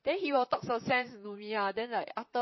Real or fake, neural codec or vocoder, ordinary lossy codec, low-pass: fake; vocoder, 44.1 kHz, 128 mel bands, Pupu-Vocoder; MP3, 24 kbps; 7.2 kHz